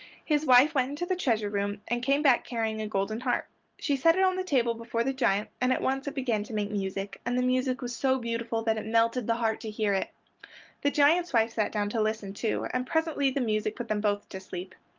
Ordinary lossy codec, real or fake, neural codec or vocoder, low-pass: Opus, 32 kbps; real; none; 7.2 kHz